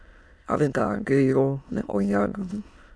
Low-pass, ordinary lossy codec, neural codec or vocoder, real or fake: none; none; autoencoder, 22.05 kHz, a latent of 192 numbers a frame, VITS, trained on many speakers; fake